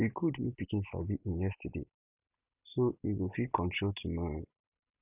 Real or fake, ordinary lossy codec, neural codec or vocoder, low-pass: real; none; none; 3.6 kHz